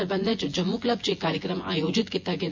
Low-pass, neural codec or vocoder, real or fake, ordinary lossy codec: 7.2 kHz; vocoder, 24 kHz, 100 mel bands, Vocos; fake; MP3, 48 kbps